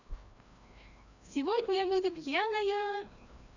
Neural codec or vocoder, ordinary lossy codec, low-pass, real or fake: codec, 16 kHz, 1 kbps, FreqCodec, larger model; none; 7.2 kHz; fake